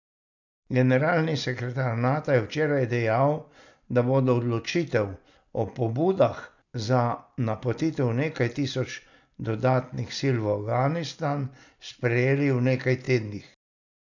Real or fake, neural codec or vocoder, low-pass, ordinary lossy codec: real; none; 7.2 kHz; none